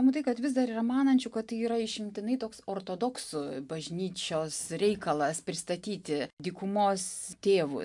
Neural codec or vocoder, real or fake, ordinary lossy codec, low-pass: none; real; MP3, 64 kbps; 10.8 kHz